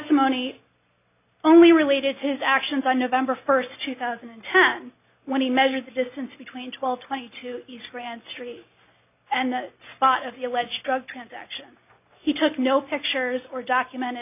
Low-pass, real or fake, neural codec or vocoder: 3.6 kHz; real; none